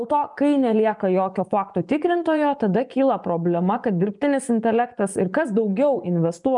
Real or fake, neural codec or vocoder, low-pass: fake; vocoder, 24 kHz, 100 mel bands, Vocos; 10.8 kHz